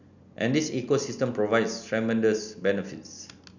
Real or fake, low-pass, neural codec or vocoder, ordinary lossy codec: real; 7.2 kHz; none; none